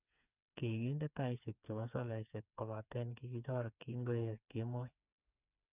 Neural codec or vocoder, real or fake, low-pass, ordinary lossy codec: codec, 16 kHz, 4 kbps, FreqCodec, smaller model; fake; 3.6 kHz; none